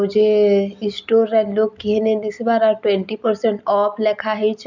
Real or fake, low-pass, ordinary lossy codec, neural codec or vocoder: real; 7.2 kHz; none; none